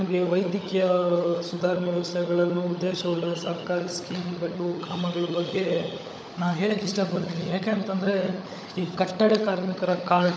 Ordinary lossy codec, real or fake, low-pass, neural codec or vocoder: none; fake; none; codec, 16 kHz, 16 kbps, FunCodec, trained on LibriTTS, 50 frames a second